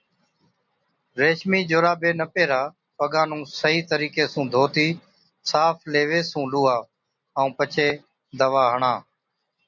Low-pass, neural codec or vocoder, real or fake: 7.2 kHz; none; real